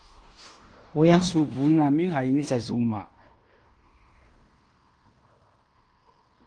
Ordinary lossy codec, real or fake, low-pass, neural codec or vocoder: Opus, 32 kbps; fake; 9.9 kHz; codec, 16 kHz in and 24 kHz out, 0.9 kbps, LongCat-Audio-Codec, fine tuned four codebook decoder